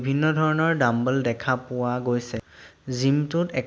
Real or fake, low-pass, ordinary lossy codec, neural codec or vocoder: real; none; none; none